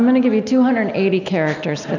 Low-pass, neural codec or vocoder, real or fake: 7.2 kHz; none; real